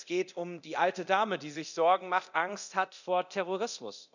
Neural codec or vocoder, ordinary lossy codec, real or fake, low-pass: codec, 24 kHz, 0.9 kbps, DualCodec; none; fake; 7.2 kHz